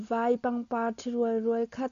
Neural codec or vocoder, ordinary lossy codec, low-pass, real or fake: none; MP3, 64 kbps; 7.2 kHz; real